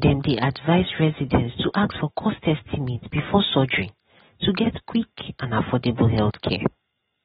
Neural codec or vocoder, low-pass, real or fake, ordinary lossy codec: none; 7.2 kHz; real; AAC, 16 kbps